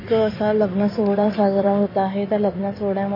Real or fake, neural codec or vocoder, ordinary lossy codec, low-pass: fake; codec, 16 kHz in and 24 kHz out, 2.2 kbps, FireRedTTS-2 codec; MP3, 24 kbps; 5.4 kHz